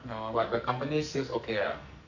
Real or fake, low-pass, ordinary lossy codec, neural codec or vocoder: fake; 7.2 kHz; none; codec, 44.1 kHz, 2.6 kbps, SNAC